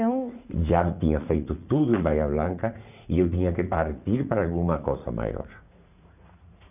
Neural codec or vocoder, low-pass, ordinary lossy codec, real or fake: vocoder, 44.1 kHz, 80 mel bands, Vocos; 3.6 kHz; none; fake